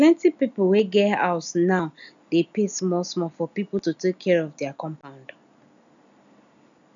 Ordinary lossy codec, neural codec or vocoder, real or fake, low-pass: none; none; real; 7.2 kHz